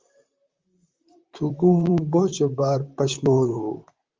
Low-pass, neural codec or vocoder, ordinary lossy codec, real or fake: 7.2 kHz; vocoder, 44.1 kHz, 128 mel bands, Pupu-Vocoder; Opus, 32 kbps; fake